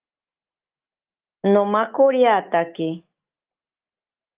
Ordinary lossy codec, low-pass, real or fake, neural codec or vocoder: Opus, 24 kbps; 3.6 kHz; fake; autoencoder, 48 kHz, 128 numbers a frame, DAC-VAE, trained on Japanese speech